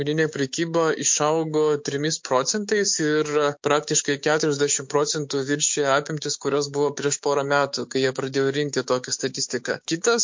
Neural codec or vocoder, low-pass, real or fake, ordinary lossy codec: codec, 16 kHz, 6 kbps, DAC; 7.2 kHz; fake; MP3, 48 kbps